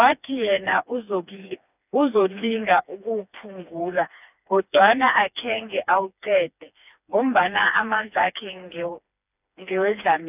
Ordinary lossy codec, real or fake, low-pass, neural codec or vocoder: none; fake; 3.6 kHz; codec, 16 kHz, 2 kbps, FreqCodec, smaller model